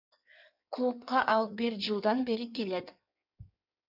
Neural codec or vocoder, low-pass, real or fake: codec, 16 kHz in and 24 kHz out, 1.1 kbps, FireRedTTS-2 codec; 5.4 kHz; fake